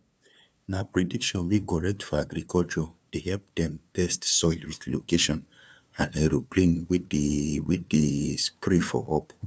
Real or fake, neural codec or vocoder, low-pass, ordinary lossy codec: fake; codec, 16 kHz, 2 kbps, FunCodec, trained on LibriTTS, 25 frames a second; none; none